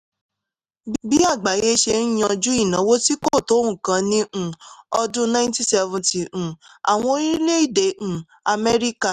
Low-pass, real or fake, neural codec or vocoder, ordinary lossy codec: 14.4 kHz; real; none; none